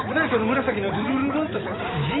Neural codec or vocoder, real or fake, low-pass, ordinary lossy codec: none; real; 7.2 kHz; AAC, 16 kbps